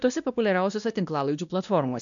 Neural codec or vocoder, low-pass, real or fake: codec, 16 kHz, 1 kbps, X-Codec, WavLM features, trained on Multilingual LibriSpeech; 7.2 kHz; fake